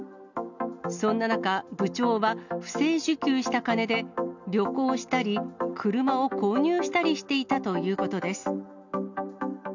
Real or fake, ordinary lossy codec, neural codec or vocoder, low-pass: real; none; none; 7.2 kHz